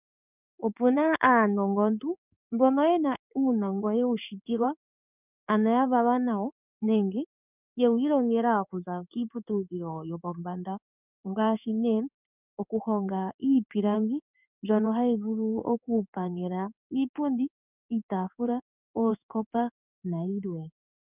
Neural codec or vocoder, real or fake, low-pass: codec, 16 kHz in and 24 kHz out, 1 kbps, XY-Tokenizer; fake; 3.6 kHz